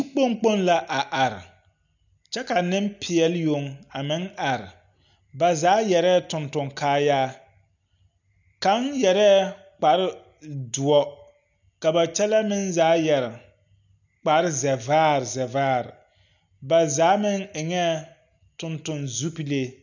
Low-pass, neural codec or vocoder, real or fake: 7.2 kHz; none; real